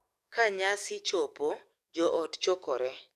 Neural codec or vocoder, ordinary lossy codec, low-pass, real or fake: codec, 44.1 kHz, 7.8 kbps, DAC; none; 14.4 kHz; fake